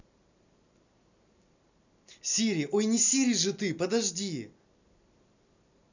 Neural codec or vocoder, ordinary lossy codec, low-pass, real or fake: none; none; 7.2 kHz; real